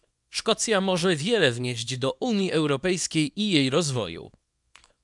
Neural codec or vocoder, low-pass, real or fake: codec, 24 kHz, 0.9 kbps, WavTokenizer, small release; 10.8 kHz; fake